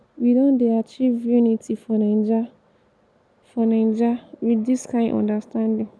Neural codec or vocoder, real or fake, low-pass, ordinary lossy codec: none; real; none; none